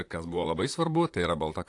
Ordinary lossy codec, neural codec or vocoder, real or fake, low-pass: AAC, 48 kbps; vocoder, 44.1 kHz, 128 mel bands, Pupu-Vocoder; fake; 10.8 kHz